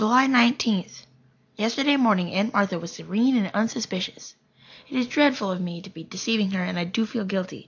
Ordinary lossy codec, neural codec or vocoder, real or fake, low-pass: AAC, 48 kbps; none; real; 7.2 kHz